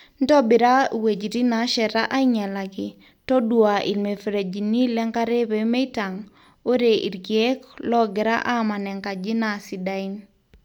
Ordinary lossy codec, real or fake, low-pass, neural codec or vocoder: none; real; 19.8 kHz; none